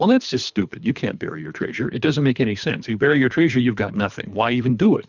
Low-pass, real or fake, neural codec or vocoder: 7.2 kHz; fake; codec, 24 kHz, 3 kbps, HILCodec